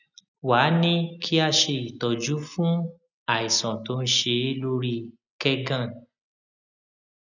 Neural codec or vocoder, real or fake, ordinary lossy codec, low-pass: none; real; none; 7.2 kHz